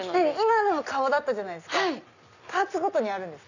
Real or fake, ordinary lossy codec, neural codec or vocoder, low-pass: real; none; none; 7.2 kHz